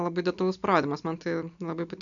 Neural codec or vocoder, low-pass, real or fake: none; 7.2 kHz; real